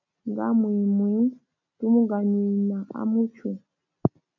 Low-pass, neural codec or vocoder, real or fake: 7.2 kHz; none; real